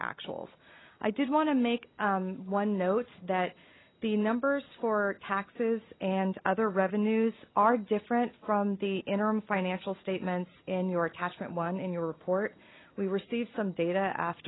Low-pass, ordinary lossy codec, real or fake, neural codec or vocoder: 7.2 kHz; AAC, 16 kbps; real; none